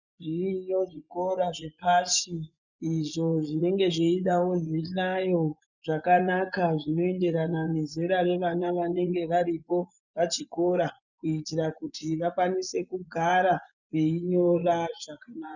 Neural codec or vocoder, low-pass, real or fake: vocoder, 24 kHz, 100 mel bands, Vocos; 7.2 kHz; fake